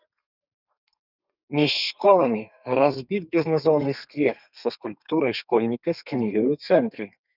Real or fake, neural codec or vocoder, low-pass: fake; codec, 32 kHz, 1.9 kbps, SNAC; 5.4 kHz